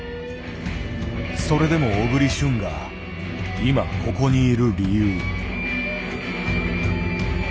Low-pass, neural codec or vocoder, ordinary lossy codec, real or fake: none; none; none; real